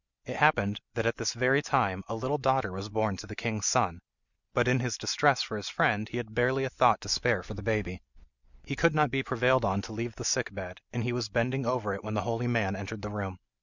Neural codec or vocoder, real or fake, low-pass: none; real; 7.2 kHz